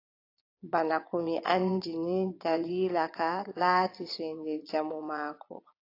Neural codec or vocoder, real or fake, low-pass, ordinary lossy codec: vocoder, 22.05 kHz, 80 mel bands, Vocos; fake; 5.4 kHz; AAC, 32 kbps